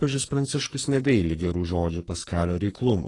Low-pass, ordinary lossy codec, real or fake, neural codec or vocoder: 10.8 kHz; AAC, 32 kbps; fake; codec, 44.1 kHz, 2.6 kbps, SNAC